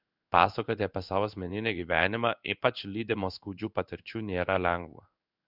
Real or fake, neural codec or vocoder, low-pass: fake; codec, 16 kHz in and 24 kHz out, 1 kbps, XY-Tokenizer; 5.4 kHz